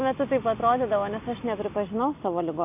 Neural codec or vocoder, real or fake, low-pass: none; real; 3.6 kHz